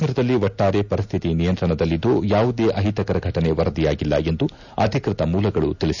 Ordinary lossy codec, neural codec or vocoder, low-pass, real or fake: none; none; 7.2 kHz; real